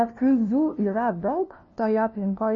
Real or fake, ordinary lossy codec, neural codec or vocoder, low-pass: fake; MP3, 32 kbps; codec, 16 kHz, 0.5 kbps, FunCodec, trained on LibriTTS, 25 frames a second; 7.2 kHz